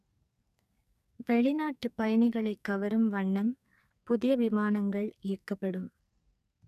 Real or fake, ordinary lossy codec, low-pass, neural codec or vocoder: fake; none; 14.4 kHz; codec, 44.1 kHz, 2.6 kbps, SNAC